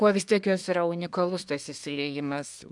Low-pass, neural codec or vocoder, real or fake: 10.8 kHz; codec, 24 kHz, 1 kbps, SNAC; fake